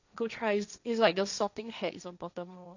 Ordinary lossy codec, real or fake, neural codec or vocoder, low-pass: none; fake; codec, 16 kHz, 1.1 kbps, Voila-Tokenizer; 7.2 kHz